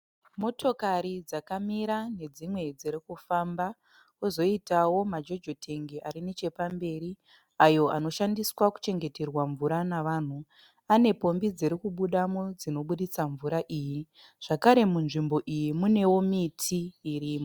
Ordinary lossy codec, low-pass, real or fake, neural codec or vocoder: Opus, 64 kbps; 19.8 kHz; real; none